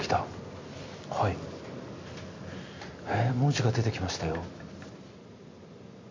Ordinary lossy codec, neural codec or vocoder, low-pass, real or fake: MP3, 48 kbps; none; 7.2 kHz; real